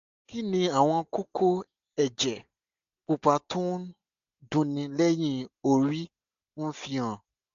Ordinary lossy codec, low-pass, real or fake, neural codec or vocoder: AAC, 64 kbps; 7.2 kHz; real; none